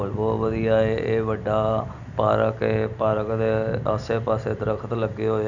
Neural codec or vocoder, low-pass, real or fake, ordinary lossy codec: none; 7.2 kHz; real; none